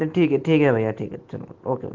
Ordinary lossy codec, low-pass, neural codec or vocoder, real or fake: Opus, 16 kbps; 7.2 kHz; none; real